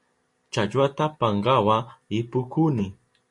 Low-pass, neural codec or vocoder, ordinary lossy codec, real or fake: 10.8 kHz; none; MP3, 48 kbps; real